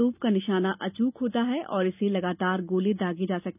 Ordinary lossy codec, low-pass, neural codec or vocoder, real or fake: none; 3.6 kHz; none; real